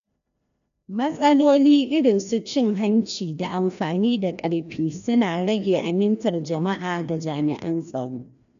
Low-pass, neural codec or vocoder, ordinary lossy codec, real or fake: 7.2 kHz; codec, 16 kHz, 1 kbps, FreqCodec, larger model; none; fake